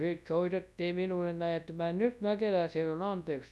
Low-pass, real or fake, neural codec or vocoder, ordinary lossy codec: none; fake; codec, 24 kHz, 0.9 kbps, WavTokenizer, large speech release; none